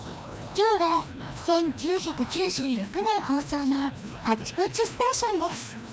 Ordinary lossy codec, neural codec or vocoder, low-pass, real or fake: none; codec, 16 kHz, 1 kbps, FreqCodec, larger model; none; fake